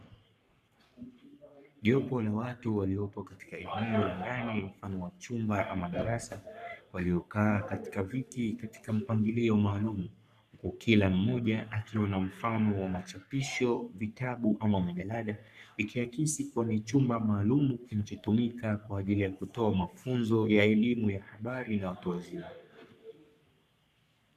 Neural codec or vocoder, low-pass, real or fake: codec, 44.1 kHz, 3.4 kbps, Pupu-Codec; 14.4 kHz; fake